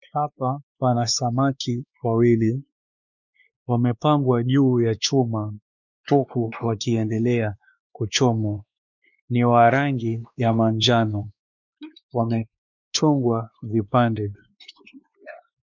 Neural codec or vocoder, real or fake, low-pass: codec, 16 kHz, 2 kbps, X-Codec, WavLM features, trained on Multilingual LibriSpeech; fake; 7.2 kHz